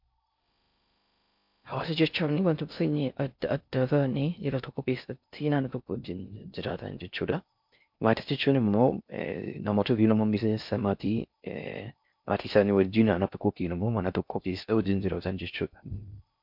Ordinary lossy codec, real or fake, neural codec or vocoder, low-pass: MP3, 48 kbps; fake; codec, 16 kHz in and 24 kHz out, 0.6 kbps, FocalCodec, streaming, 4096 codes; 5.4 kHz